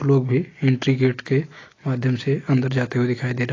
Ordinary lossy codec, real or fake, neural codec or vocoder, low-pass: AAC, 32 kbps; real; none; 7.2 kHz